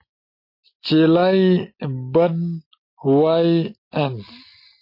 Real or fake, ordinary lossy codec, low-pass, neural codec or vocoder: real; MP3, 32 kbps; 5.4 kHz; none